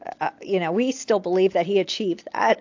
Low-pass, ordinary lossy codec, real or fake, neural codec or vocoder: 7.2 kHz; AAC, 48 kbps; real; none